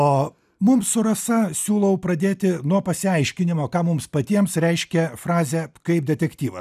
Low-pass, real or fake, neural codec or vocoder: 14.4 kHz; real; none